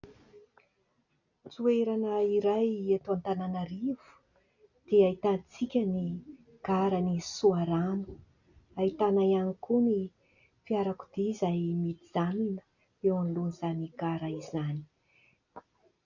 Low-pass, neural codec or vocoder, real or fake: 7.2 kHz; none; real